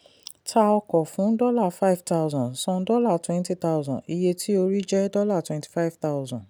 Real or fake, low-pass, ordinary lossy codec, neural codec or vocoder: real; none; none; none